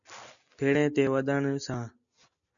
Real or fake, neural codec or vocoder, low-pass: real; none; 7.2 kHz